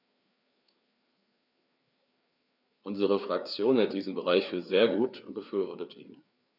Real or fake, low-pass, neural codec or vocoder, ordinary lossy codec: fake; 5.4 kHz; codec, 16 kHz, 4 kbps, FreqCodec, larger model; none